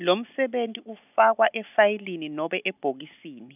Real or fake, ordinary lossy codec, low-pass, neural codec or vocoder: real; none; 3.6 kHz; none